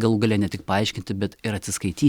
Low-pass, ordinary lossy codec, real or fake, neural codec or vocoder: 19.8 kHz; Opus, 64 kbps; real; none